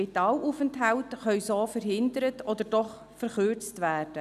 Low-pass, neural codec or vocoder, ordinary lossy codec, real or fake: 14.4 kHz; none; none; real